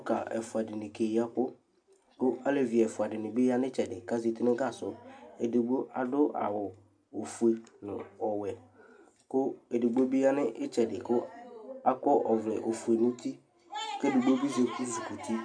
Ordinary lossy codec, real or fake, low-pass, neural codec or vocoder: AAC, 64 kbps; real; 9.9 kHz; none